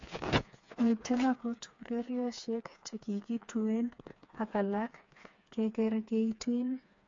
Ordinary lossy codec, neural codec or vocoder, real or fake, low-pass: MP3, 48 kbps; codec, 16 kHz, 4 kbps, FreqCodec, smaller model; fake; 7.2 kHz